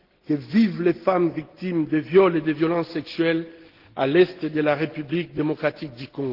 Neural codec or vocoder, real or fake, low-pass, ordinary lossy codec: none; real; 5.4 kHz; Opus, 16 kbps